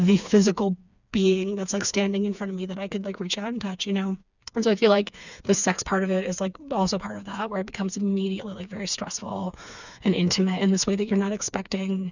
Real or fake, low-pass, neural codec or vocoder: fake; 7.2 kHz; codec, 16 kHz, 4 kbps, FreqCodec, smaller model